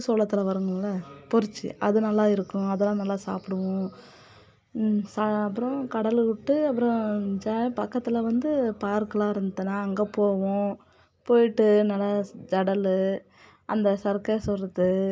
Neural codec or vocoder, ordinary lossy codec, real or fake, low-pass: none; none; real; none